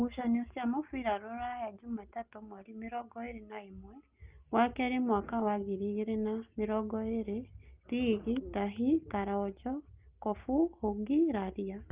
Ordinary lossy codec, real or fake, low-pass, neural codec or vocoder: Opus, 32 kbps; real; 3.6 kHz; none